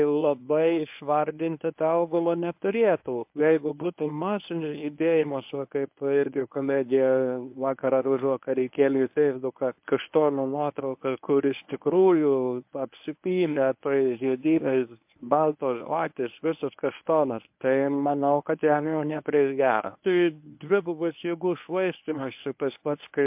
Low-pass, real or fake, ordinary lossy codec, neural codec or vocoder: 3.6 kHz; fake; MP3, 32 kbps; codec, 24 kHz, 0.9 kbps, WavTokenizer, medium speech release version 1